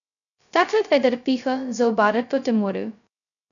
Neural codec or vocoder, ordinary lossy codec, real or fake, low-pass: codec, 16 kHz, 0.2 kbps, FocalCodec; none; fake; 7.2 kHz